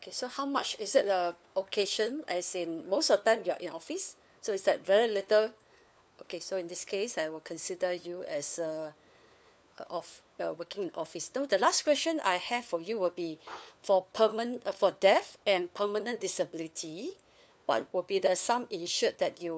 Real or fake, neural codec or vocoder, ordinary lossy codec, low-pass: fake; codec, 16 kHz, 4 kbps, FunCodec, trained on LibriTTS, 50 frames a second; none; none